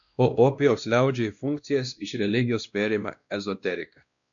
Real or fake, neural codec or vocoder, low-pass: fake; codec, 16 kHz, 1 kbps, X-Codec, WavLM features, trained on Multilingual LibriSpeech; 7.2 kHz